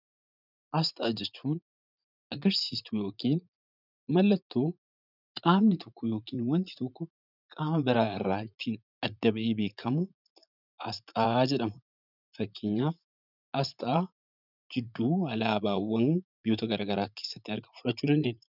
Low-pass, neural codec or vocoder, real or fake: 5.4 kHz; vocoder, 44.1 kHz, 80 mel bands, Vocos; fake